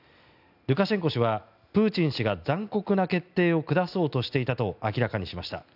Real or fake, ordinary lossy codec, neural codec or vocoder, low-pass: real; none; none; 5.4 kHz